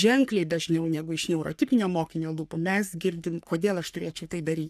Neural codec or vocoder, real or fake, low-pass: codec, 44.1 kHz, 3.4 kbps, Pupu-Codec; fake; 14.4 kHz